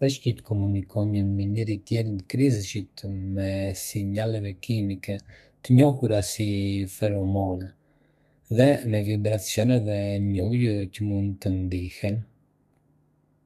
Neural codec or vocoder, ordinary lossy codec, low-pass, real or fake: codec, 32 kHz, 1.9 kbps, SNAC; none; 14.4 kHz; fake